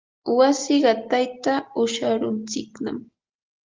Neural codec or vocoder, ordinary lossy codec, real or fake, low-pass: none; Opus, 32 kbps; real; 7.2 kHz